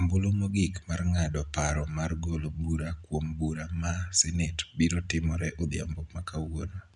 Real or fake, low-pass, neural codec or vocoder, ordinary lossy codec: real; 10.8 kHz; none; none